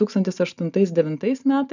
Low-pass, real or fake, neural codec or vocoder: 7.2 kHz; real; none